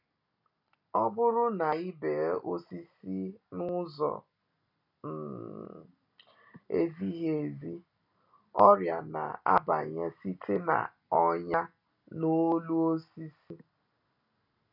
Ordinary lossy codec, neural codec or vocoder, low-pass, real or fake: none; none; 5.4 kHz; real